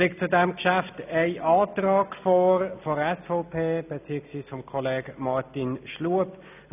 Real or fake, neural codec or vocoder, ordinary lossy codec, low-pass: real; none; none; 3.6 kHz